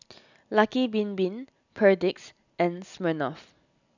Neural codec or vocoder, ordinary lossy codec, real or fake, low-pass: none; none; real; 7.2 kHz